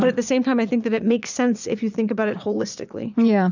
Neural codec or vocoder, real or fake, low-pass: vocoder, 22.05 kHz, 80 mel bands, Vocos; fake; 7.2 kHz